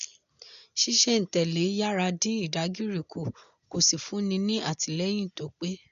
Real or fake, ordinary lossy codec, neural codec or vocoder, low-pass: real; none; none; 7.2 kHz